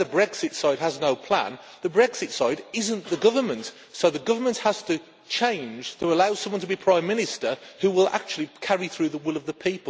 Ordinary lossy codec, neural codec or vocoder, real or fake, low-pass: none; none; real; none